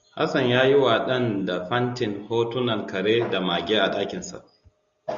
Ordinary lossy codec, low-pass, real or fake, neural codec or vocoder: Opus, 64 kbps; 7.2 kHz; real; none